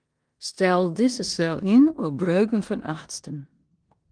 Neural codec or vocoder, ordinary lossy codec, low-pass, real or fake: codec, 16 kHz in and 24 kHz out, 0.9 kbps, LongCat-Audio-Codec, four codebook decoder; Opus, 24 kbps; 9.9 kHz; fake